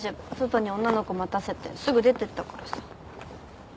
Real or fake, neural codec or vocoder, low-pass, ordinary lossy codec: real; none; none; none